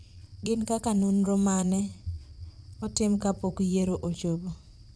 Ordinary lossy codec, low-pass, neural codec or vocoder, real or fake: none; none; vocoder, 22.05 kHz, 80 mel bands, Vocos; fake